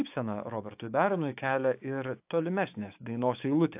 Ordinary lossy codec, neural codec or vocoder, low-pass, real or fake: AAC, 32 kbps; codec, 16 kHz, 6 kbps, DAC; 3.6 kHz; fake